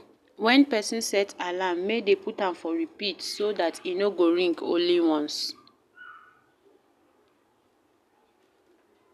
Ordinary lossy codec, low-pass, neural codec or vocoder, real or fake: none; 14.4 kHz; none; real